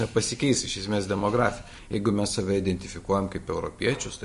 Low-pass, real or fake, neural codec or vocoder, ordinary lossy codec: 14.4 kHz; real; none; MP3, 48 kbps